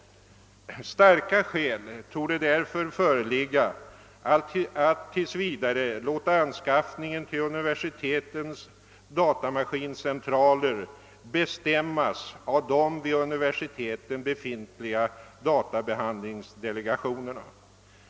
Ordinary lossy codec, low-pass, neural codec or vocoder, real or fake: none; none; none; real